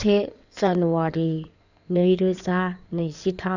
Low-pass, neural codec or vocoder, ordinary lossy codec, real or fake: 7.2 kHz; codec, 16 kHz in and 24 kHz out, 2.2 kbps, FireRedTTS-2 codec; none; fake